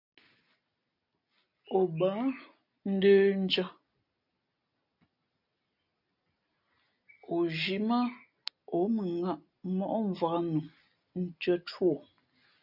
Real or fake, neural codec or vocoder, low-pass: real; none; 5.4 kHz